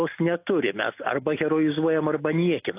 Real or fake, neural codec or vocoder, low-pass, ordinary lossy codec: real; none; 3.6 kHz; AAC, 24 kbps